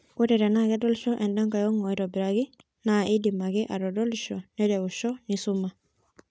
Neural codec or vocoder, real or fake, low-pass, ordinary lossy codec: none; real; none; none